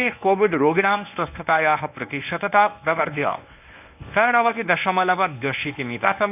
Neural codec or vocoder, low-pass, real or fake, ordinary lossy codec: codec, 24 kHz, 0.9 kbps, WavTokenizer, medium speech release version 1; 3.6 kHz; fake; none